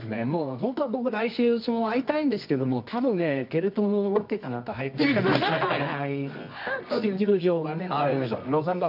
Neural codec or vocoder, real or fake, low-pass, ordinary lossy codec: codec, 24 kHz, 0.9 kbps, WavTokenizer, medium music audio release; fake; 5.4 kHz; none